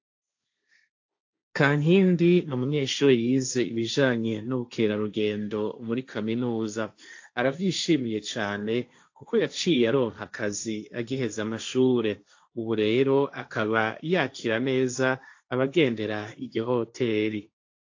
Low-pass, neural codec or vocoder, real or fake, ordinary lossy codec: 7.2 kHz; codec, 16 kHz, 1.1 kbps, Voila-Tokenizer; fake; AAC, 48 kbps